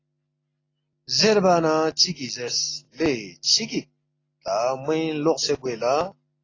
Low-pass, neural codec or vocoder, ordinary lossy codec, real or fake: 7.2 kHz; none; AAC, 32 kbps; real